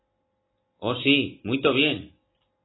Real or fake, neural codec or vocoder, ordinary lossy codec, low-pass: real; none; AAC, 16 kbps; 7.2 kHz